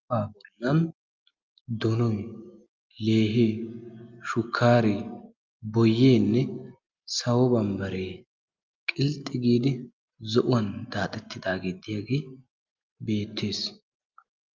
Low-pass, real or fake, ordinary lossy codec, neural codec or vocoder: 7.2 kHz; real; Opus, 24 kbps; none